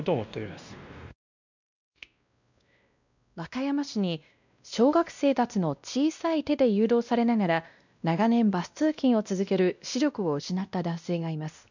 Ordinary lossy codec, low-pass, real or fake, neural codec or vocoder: none; 7.2 kHz; fake; codec, 16 kHz, 1 kbps, X-Codec, WavLM features, trained on Multilingual LibriSpeech